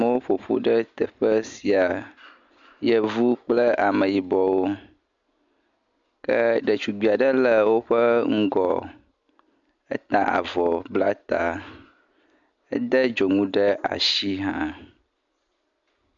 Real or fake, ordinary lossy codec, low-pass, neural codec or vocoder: real; MP3, 64 kbps; 7.2 kHz; none